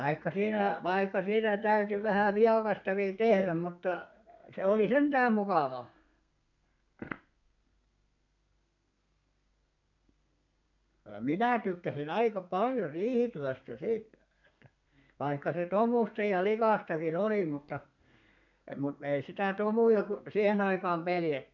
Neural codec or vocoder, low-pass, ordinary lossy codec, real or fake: codec, 32 kHz, 1.9 kbps, SNAC; 7.2 kHz; none; fake